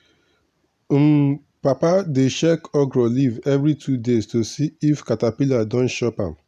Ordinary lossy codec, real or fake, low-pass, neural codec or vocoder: none; real; 14.4 kHz; none